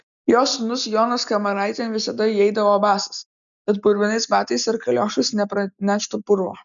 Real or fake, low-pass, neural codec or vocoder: real; 7.2 kHz; none